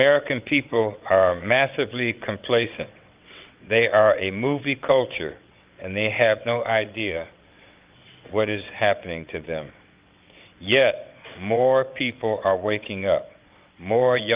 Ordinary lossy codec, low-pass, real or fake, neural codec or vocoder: Opus, 24 kbps; 3.6 kHz; fake; vocoder, 22.05 kHz, 80 mel bands, WaveNeXt